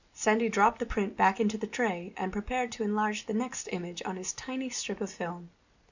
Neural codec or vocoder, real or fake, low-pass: none; real; 7.2 kHz